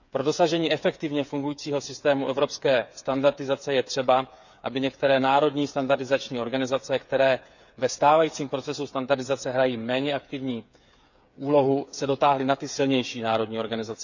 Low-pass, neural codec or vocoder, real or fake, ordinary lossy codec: 7.2 kHz; codec, 16 kHz, 8 kbps, FreqCodec, smaller model; fake; none